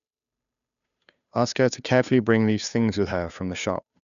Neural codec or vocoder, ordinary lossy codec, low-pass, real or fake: codec, 16 kHz, 2 kbps, FunCodec, trained on Chinese and English, 25 frames a second; none; 7.2 kHz; fake